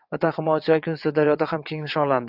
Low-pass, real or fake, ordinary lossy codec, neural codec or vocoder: 5.4 kHz; fake; AAC, 48 kbps; vocoder, 22.05 kHz, 80 mel bands, WaveNeXt